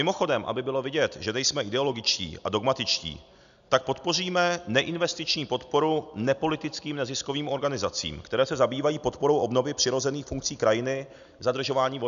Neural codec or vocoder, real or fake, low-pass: none; real; 7.2 kHz